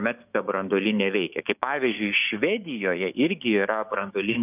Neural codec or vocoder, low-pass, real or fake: codec, 16 kHz, 6 kbps, DAC; 3.6 kHz; fake